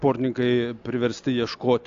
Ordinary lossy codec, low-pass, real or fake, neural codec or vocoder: AAC, 64 kbps; 7.2 kHz; real; none